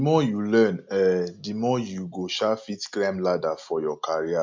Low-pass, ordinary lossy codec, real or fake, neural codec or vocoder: 7.2 kHz; MP3, 64 kbps; real; none